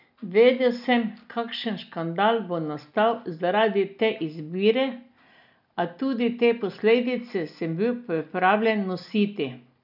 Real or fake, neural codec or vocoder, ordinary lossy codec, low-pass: real; none; none; 5.4 kHz